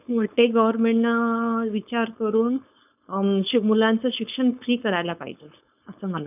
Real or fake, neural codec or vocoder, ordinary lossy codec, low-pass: fake; codec, 16 kHz, 4.8 kbps, FACodec; none; 3.6 kHz